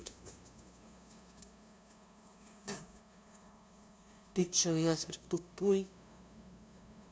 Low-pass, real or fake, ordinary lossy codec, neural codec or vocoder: none; fake; none; codec, 16 kHz, 0.5 kbps, FunCodec, trained on LibriTTS, 25 frames a second